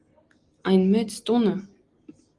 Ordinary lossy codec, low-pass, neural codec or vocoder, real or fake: Opus, 24 kbps; 10.8 kHz; none; real